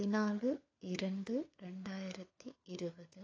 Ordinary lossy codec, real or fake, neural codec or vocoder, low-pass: AAC, 32 kbps; fake; codec, 16 kHz, 4 kbps, FunCodec, trained on LibriTTS, 50 frames a second; 7.2 kHz